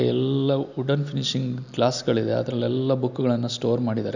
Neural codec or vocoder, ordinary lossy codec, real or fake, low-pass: none; none; real; 7.2 kHz